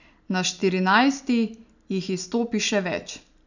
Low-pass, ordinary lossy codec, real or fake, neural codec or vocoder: 7.2 kHz; none; real; none